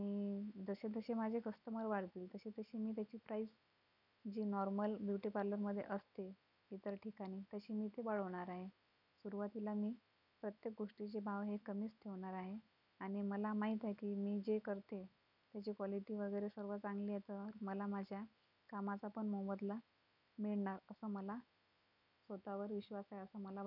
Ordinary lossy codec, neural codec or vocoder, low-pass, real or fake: none; none; 5.4 kHz; real